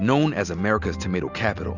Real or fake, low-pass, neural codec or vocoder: real; 7.2 kHz; none